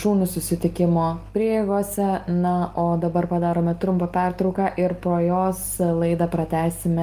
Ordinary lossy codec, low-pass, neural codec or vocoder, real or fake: Opus, 24 kbps; 14.4 kHz; none; real